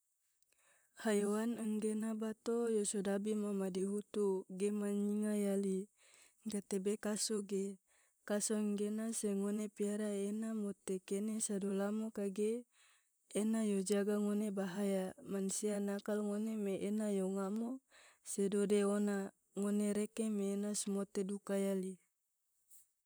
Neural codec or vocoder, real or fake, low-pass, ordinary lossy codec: vocoder, 44.1 kHz, 128 mel bands, Pupu-Vocoder; fake; none; none